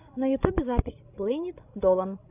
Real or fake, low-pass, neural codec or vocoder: fake; 3.6 kHz; codec, 16 kHz, 16 kbps, FreqCodec, larger model